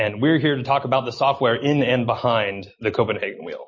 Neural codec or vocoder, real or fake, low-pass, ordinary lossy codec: none; real; 7.2 kHz; MP3, 32 kbps